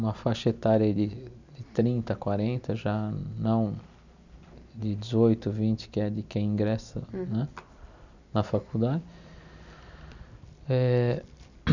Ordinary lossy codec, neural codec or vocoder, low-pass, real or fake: none; none; 7.2 kHz; real